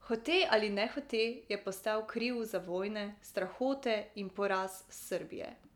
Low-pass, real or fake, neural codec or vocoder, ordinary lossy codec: 19.8 kHz; real; none; none